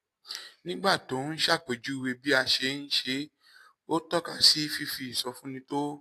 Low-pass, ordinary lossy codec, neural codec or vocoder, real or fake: 14.4 kHz; AAC, 64 kbps; none; real